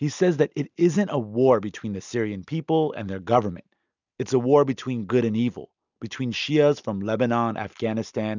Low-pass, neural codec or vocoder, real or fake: 7.2 kHz; none; real